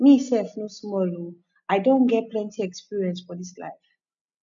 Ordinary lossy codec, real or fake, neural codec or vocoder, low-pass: none; real; none; 7.2 kHz